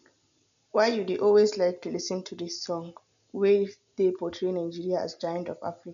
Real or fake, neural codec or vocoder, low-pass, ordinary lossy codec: real; none; 7.2 kHz; none